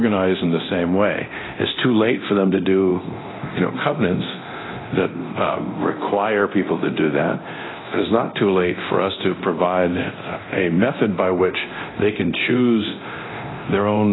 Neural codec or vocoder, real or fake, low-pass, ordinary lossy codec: codec, 24 kHz, 0.9 kbps, DualCodec; fake; 7.2 kHz; AAC, 16 kbps